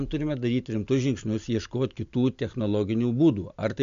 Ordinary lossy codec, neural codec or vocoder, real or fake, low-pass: MP3, 64 kbps; none; real; 7.2 kHz